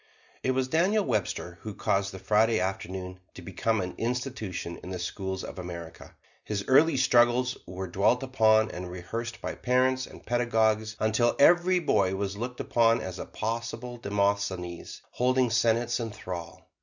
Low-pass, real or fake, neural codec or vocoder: 7.2 kHz; real; none